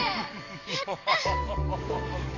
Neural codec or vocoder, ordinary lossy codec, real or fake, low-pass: codec, 44.1 kHz, 7.8 kbps, DAC; none; fake; 7.2 kHz